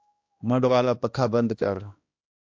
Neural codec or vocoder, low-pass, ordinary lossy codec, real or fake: codec, 16 kHz, 1 kbps, X-Codec, HuBERT features, trained on balanced general audio; 7.2 kHz; AAC, 48 kbps; fake